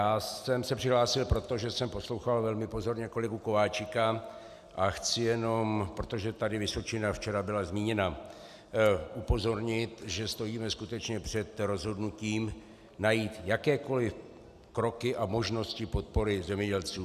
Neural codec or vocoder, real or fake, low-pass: none; real; 14.4 kHz